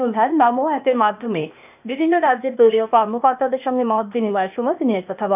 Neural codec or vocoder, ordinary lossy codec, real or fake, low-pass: codec, 16 kHz, 0.8 kbps, ZipCodec; none; fake; 3.6 kHz